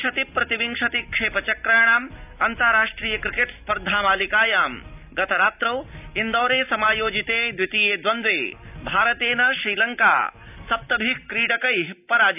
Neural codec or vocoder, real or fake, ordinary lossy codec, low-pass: none; real; none; 3.6 kHz